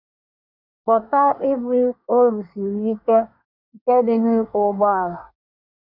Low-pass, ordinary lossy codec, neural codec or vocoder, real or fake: 5.4 kHz; none; codec, 24 kHz, 1 kbps, SNAC; fake